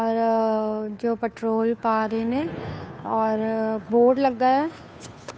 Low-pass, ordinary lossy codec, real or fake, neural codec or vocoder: none; none; fake; codec, 16 kHz, 2 kbps, FunCodec, trained on Chinese and English, 25 frames a second